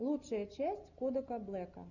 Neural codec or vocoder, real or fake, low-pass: none; real; 7.2 kHz